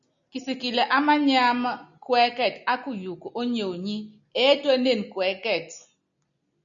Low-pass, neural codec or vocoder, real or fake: 7.2 kHz; none; real